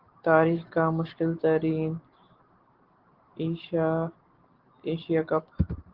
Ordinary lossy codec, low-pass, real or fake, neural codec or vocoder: Opus, 16 kbps; 5.4 kHz; real; none